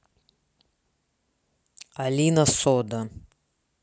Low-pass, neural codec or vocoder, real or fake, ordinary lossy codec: none; none; real; none